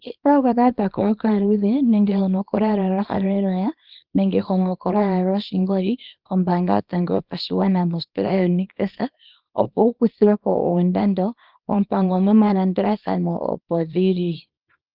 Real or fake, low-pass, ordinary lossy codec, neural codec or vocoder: fake; 5.4 kHz; Opus, 24 kbps; codec, 24 kHz, 0.9 kbps, WavTokenizer, small release